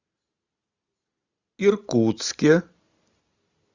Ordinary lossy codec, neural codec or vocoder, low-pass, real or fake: Opus, 64 kbps; none; 7.2 kHz; real